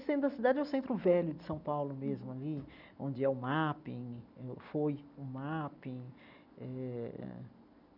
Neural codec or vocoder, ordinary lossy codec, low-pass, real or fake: none; none; 5.4 kHz; real